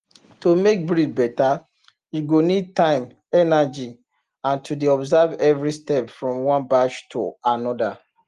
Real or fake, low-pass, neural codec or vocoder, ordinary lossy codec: real; 10.8 kHz; none; Opus, 24 kbps